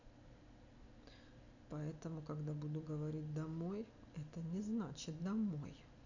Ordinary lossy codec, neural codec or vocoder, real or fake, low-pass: none; none; real; 7.2 kHz